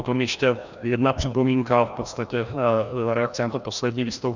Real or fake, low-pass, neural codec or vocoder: fake; 7.2 kHz; codec, 16 kHz, 1 kbps, FreqCodec, larger model